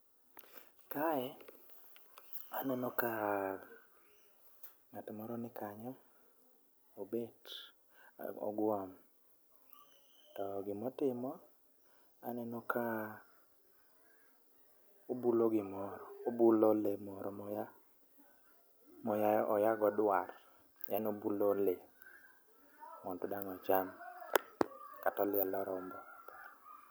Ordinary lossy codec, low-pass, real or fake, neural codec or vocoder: none; none; real; none